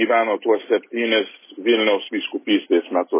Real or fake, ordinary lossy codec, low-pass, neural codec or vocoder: real; MP3, 16 kbps; 3.6 kHz; none